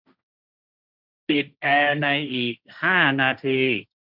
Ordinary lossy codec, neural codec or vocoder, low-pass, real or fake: none; codec, 16 kHz, 1.1 kbps, Voila-Tokenizer; 5.4 kHz; fake